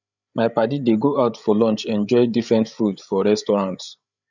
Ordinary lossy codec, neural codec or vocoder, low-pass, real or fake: none; codec, 16 kHz, 8 kbps, FreqCodec, larger model; 7.2 kHz; fake